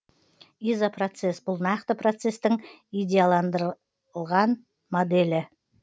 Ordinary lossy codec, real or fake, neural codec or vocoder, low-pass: none; real; none; none